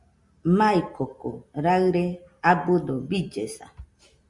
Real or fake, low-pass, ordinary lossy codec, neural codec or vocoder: real; 10.8 kHz; Opus, 64 kbps; none